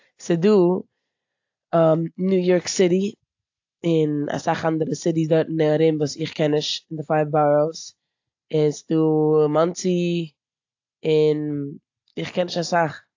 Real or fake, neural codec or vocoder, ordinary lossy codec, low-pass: real; none; AAC, 48 kbps; 7.2 kHz